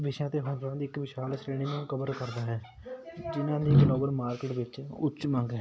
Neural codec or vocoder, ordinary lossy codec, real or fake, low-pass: none; none; real; none